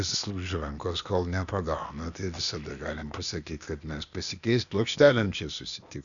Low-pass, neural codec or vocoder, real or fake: 7.2 kHz; codec, 16 kHz, 0.8 kbps, ZipCodec; fake